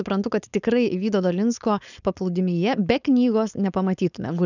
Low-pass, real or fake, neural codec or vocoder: 7.2 kHz; fake; codec, 16 kHz, 4.8 kbps, FACodec